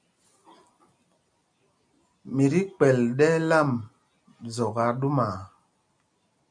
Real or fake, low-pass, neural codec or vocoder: real; 9.9 kHz; none